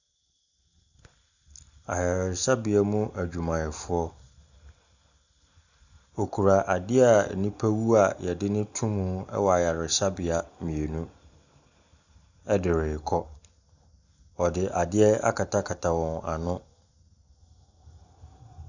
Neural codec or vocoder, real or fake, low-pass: none; real; 7.2 kHz